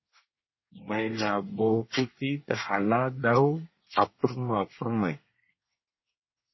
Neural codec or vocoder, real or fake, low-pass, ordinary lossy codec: codec, 24 kHz, 1 kbps, SNAC; fake; 7.2 kHz; MP3, 24 kbps